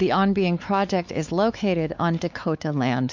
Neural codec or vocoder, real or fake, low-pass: codec, 16 kHz, 4 kbps, X-Codec, WavLM features, trained on Multilingual LibriSpeech; fake; 7.2 kHz